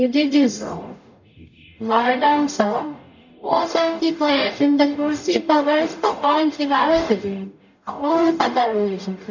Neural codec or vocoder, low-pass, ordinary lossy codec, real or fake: codec, 44.1 kHz, 0.9 kbps, DAC; 7.2 kHz; none; fake